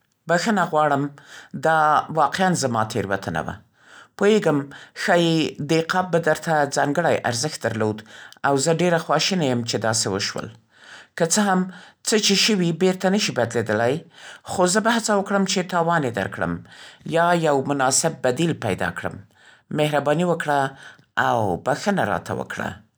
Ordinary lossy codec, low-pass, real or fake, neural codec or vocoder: none; none; real; none